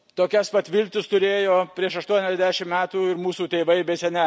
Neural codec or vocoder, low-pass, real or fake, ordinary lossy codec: none; none; real; none